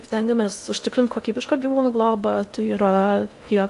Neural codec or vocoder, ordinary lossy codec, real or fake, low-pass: codec, 16 kHz in and 24 kHz out, 0.6 kbps, FocalCodec, streaming, 2048 codes; AAC, 64 kbps; fake; 10.8 kHz